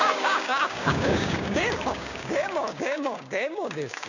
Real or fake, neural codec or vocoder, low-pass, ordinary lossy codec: fake; codec, 16 kHz, 6 kbps, DAC; 7.2 kHz; none